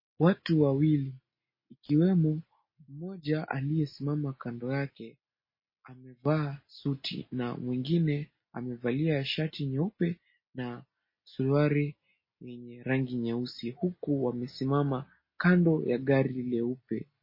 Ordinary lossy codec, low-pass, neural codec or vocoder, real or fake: MP3, 24 kbps; 5.4 kHz; none; real